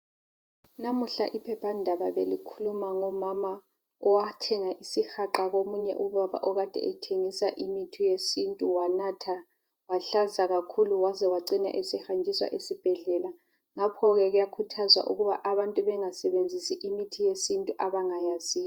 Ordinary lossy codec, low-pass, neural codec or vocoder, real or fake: Opus, 64 kbps; 19.8 kHz; vocoder, 48 kHz, 128 mel bands, Vocos; fake